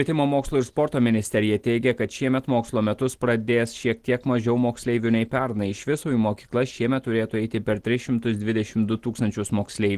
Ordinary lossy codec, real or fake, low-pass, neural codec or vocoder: Opus, 16 kbps; real; 14.4 kHz; none